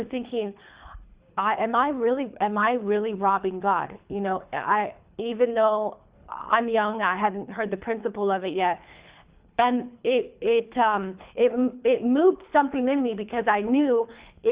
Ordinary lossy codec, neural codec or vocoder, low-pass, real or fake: Opus, 64 kbps; codec, 24 kHz, 3 kbps, HILCodec; 3.6 kHz; fake